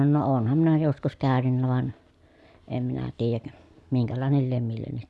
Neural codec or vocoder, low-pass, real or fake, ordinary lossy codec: none; none; real; none